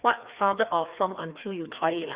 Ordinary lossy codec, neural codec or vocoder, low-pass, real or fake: Opus, 64 kbps; codec, 16 kHz, 2 kbps, FreqCodec, larger model; 3.6 kHz; fake